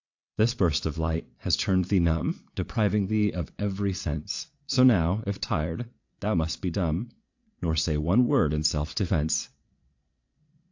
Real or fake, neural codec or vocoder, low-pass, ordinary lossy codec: fake; vocoder, 22.05 kHz, 80 mel bands, Vocos; 7.2 kHz; AAC, 48 kbps